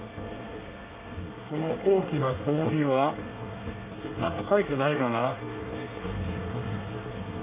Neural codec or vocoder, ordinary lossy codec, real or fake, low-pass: codec, 24 kHz, 1 kbps, SNAC; Opus, 64 kbps; fake; 3.6 kHz